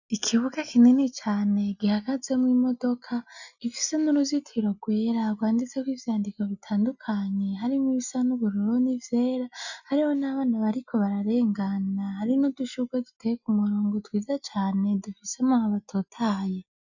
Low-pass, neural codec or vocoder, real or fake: 7.2 kHz; none; real